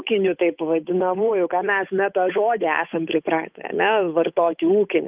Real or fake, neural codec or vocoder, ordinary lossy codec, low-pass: fake; codec, 16 kHz, 8 kbps, FunCodec, trained on Chinese and English, 25 frames a second; Opus, 32 kbps; 3.6 kHz